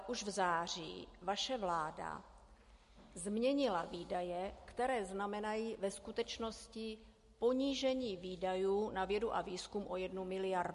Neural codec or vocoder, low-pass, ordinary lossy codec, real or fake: none; 14.4 kHz; MP3, 48 kbps; real